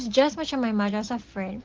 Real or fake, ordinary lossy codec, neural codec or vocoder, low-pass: real; Opus, 16 kbps; none; 7.2 kHz